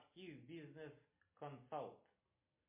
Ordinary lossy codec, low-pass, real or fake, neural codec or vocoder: MP3, 24 kbps; 3.6 kHz; real; none